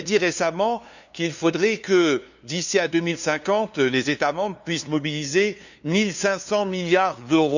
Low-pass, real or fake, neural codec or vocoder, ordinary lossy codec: 7.2 kHz; fake; codec, 16 kHz, 2 kbps, FunCodec, trained on LibriTTS, 25 frames a second; none